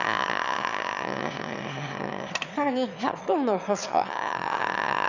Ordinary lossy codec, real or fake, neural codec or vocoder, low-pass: none; fake; autoencoder, 22.05 kHz, a latent of 192 numbers a frame, VITS, trained on one speaker; 7.2 kHz